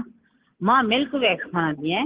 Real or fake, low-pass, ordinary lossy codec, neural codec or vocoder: real; 3.6 kHz; Opus, 16 kbps; none